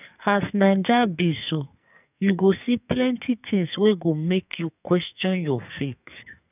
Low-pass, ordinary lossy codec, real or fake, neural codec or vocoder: 3.6 kHz; none; fake; codec, 44.1 kHz, 2.6 kbps, SNAC